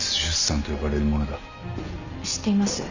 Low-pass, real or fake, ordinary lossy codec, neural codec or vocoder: 7.2 kHz; real; Opus, 64 kbps; none